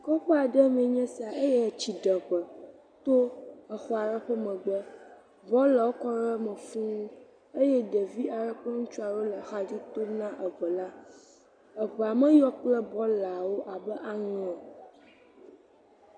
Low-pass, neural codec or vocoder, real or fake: 9.9 kHz; none; real